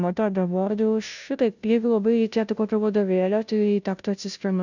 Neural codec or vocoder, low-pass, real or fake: codec, 16 kHz, 0.5 kbps, FunCodec, trained on Chinese and English, 25 frames a second; 7.2 kHz; fake